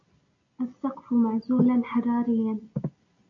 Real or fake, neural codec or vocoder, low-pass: real; none; 7.2 kHz